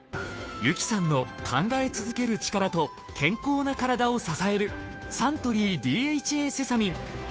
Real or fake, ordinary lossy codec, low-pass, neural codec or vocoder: fake; none; none; codec, 16 kHz, 2 kbps, FunCodec, trained on Chinese and English, 25 frames a second